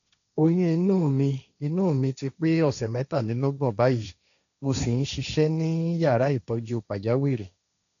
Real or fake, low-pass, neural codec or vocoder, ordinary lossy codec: fake; 7.2 kHz; codec, 16 kHz, 1.1 kbps, Voila-Tokenizer; none